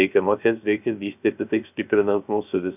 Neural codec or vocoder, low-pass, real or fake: codec, 16 kHz, 0.3 kbps, FocalCodec; 3.6 kHz; fake